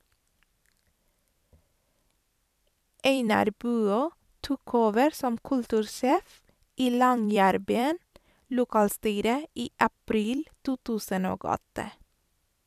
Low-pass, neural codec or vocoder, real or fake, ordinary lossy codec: 14.4 kHz; vocoder, 44.1 kHz, 128 mel bands every 256 samples, BigVGAN v2; fake; none